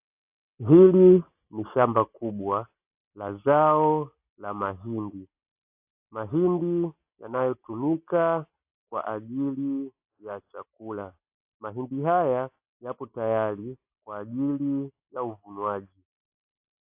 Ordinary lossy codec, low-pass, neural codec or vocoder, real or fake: AAC, 32 kbps; 3.6 kHz; none; real